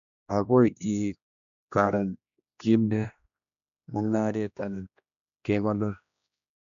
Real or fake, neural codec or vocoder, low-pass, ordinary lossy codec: fake; codec, 16 kHz, 1 kbps, X-Codec, HuBERT features, trained on general audio; 7.2 kHz; MP3, 96 kbps